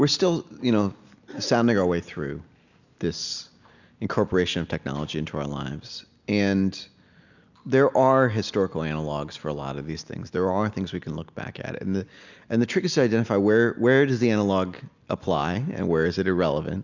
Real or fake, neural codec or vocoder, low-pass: real; none; 7.2 kHz